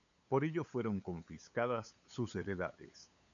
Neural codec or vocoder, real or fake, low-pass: codec, 16 kHz, 8 kbps, FunCodec, trained on LibriTTS, 25 frames a second; fake; 7.2 kHz